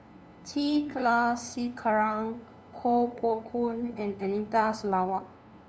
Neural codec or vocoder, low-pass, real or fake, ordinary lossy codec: codec, 16 kHz, 2 kbps, FunCodec, trained on LibriTTS, 25 frames a second; none; fake; none